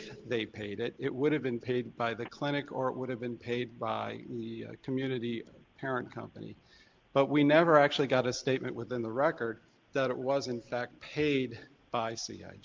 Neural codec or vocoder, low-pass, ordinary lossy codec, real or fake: none; 7.2 kHz; Opus, 32 kbps; real